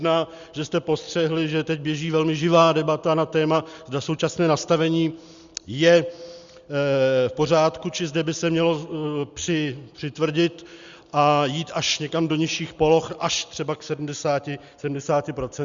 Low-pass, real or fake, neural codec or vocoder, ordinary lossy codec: 7.2 kHz; real; none; Opus, 64 kbps